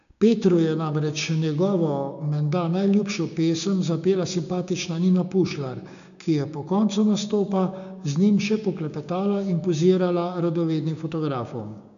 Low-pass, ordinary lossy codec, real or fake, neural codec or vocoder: 7.2 kHz; none; fake; codec, 16 kHz, 6 kbps, DAC